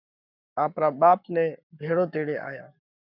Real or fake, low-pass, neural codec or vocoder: fake; 5.4 kHz; codec, 44.1 kHz, 7.8 kbps, Pupu-Codec